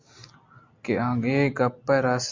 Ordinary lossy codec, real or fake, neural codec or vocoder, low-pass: MP3, 64 kbps; real; none; 7.2 kHz